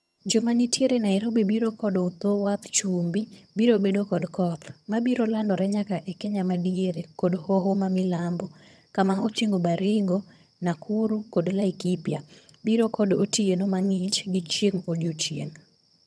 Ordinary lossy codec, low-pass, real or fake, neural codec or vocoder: none; none; fake; vocoder, 22.05 kHz, 80 mel bands, HiFi-GAN